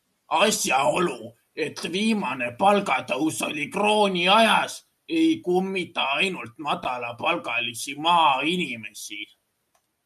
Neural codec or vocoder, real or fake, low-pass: none; real; 14.4 kHz